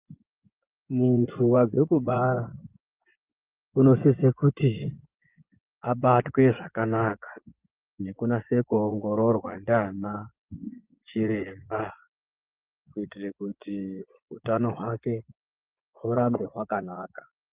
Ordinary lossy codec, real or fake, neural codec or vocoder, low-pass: Opus, 24 kbps; fake; vocoder, 24 kHz, 100 mel bands, Vocos; 3.6 kHz